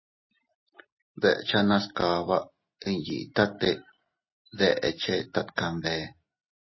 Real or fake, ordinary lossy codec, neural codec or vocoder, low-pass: real; MP3, 24 kbps; none; 7.2 kHz